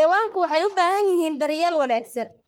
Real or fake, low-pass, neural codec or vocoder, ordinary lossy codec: fake; none; codec, 44.1 kHz, 1.7 kbps, Pupu-Codec; none